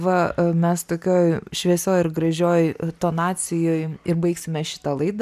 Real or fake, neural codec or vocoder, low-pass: real; none; 14.4 kHz